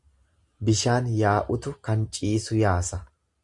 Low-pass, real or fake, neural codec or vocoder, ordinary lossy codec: 10.8 kHz; real; none; Opus, 64 kbps